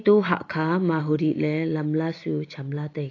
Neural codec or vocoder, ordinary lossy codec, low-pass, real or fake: none; AAC, 32 kbps; 7.2 kHz; real